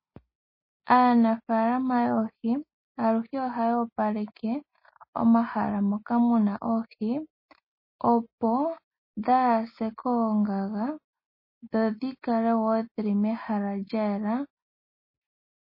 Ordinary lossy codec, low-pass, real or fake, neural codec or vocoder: MP3, 24 kbps; 5.4 kHz; real; none